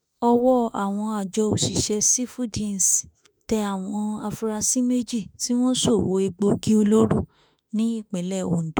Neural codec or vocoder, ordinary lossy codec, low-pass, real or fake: autoencoder, 48 kHz, 32 numbers a frame, DAC-VAE, trained on Japanese speech; none; none; fake